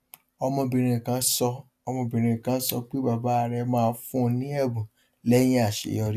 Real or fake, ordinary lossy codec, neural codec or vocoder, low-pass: real; AAC, 96 kbps; none; 14.4 kHz